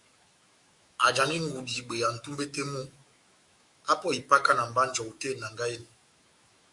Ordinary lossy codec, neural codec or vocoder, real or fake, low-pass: Opus, 64 kbps; autoencoder, 48 kHz, 128 numbers a frame, DAC-VAE, trained on Japanese speech; fake; 10.8 kHz